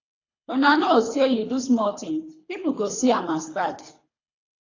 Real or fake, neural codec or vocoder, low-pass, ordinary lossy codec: fake; codec, 24 kHz, 3 kbps, HILCodec; 7.2 kHz; AAC, 32 kbps